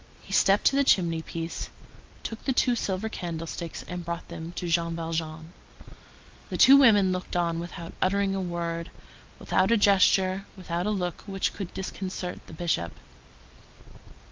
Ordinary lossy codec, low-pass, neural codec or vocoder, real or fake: Opus, 32 kbps; 7.2 kHz; none; real